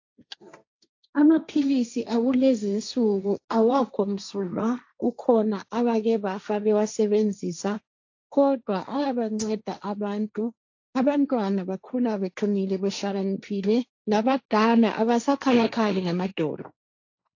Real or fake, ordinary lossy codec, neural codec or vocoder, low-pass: fake; AAC, 48 kbps; codec, 16 kHz, 1.1 kbps, Voila-Tokenizer; 7.2 kHz